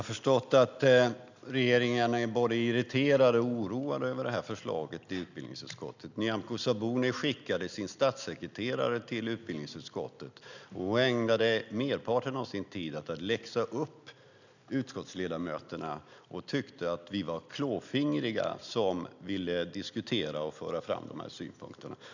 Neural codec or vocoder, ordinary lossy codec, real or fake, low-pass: none; none; real; 7.2 kHz